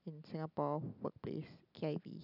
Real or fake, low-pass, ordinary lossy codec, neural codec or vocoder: real; 5.4 kHz; none; none